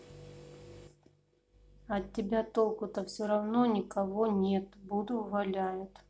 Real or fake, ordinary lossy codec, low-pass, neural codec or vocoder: real; none; none; none